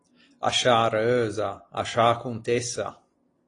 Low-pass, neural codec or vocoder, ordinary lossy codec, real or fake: 9.9 kHz; none; AAC, 32 kbps; real